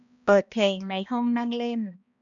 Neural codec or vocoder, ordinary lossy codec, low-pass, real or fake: codec, 16 kHz, 1 kbps, X-Codec, HuBERT features, trained on balanced general audio; none; 7.2 kHz; fake